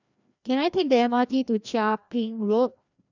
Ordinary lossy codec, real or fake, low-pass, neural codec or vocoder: none; fake; 7.2 kHz; codec, 16 kHz, 1 kbps, FreqCodec, larger model